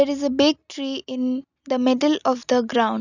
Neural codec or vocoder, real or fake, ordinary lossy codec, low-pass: none; real; none; 7.2 kHz